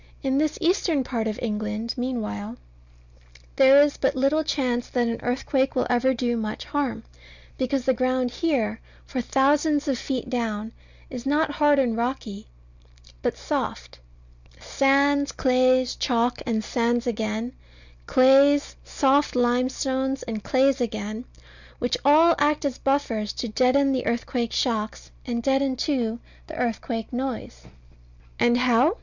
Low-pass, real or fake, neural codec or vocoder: 7.2 kHz; real; none